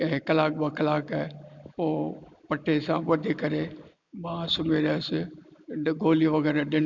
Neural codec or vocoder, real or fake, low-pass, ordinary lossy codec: none; real; 7.2 kHz; none